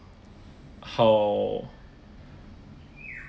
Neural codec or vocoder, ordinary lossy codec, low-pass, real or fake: none; none; none; real